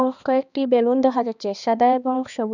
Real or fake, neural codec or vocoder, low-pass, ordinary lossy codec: fake; codec, 16 kHz, 2 kbps, X-Codec, HuBERT features, trained on balanced general audio; 7.2 kHz; none